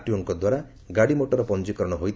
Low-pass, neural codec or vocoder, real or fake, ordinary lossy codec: none; none; real; none